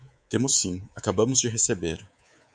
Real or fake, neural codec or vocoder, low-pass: fake; codec, 24 kHz, 3.1 kbps, DualCodec; 9.9 kHz